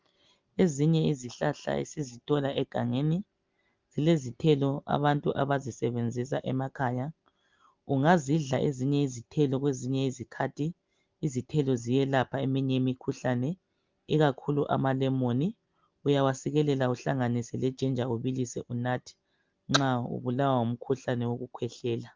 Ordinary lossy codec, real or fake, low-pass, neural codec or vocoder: Opus, 24 kbps; real; 7.2 kHz; none